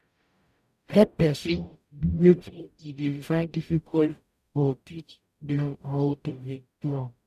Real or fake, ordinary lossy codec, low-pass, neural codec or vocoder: fake; none; 14.4 kHz; codec, 44.1 kHz, 0.9 kbps, DAC